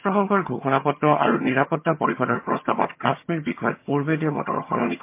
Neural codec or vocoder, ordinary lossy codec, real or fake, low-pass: vocoder, 22.05 kHz, 80 mel bands, HiFi-GAN; MP3, 24 kbps; fake; 3.6 kHz